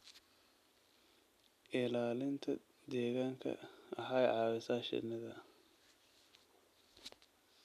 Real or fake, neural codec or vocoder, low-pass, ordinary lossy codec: real; none; 14.4 kHz; none